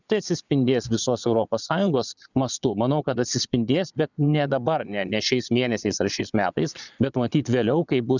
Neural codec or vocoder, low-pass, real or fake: vocoder, 22.05 kHz, 80 mel bands, Vocos; 7.2 kHz; fake